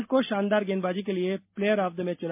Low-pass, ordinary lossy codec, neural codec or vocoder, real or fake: 3.6 kHz; MP3, 32 kbps; none; real